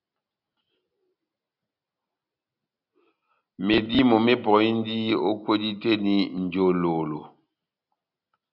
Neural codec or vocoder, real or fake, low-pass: none; real; 5.4 kHz